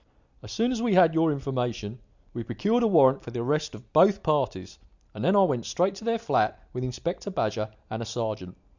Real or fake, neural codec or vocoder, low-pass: real; none; 7.2 kHz